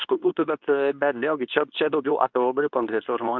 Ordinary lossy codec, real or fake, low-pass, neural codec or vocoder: MP3, 64 kbps; fake; 7.2 kHz; codec, 24 kHz, 0.9 kbps, WavTokenizer, medium speech release version 2